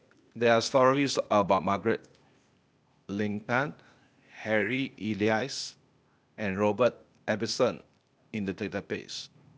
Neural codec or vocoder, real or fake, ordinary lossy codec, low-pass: codec, 16 kHz, 0.8 kbps, ZipCodec; fake; none; none